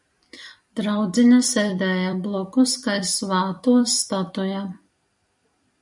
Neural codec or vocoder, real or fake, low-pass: vocoder, 44.1 kHz, 128 mel bands every 256 samples, BigVGAN v2; fake; 10.8 kHz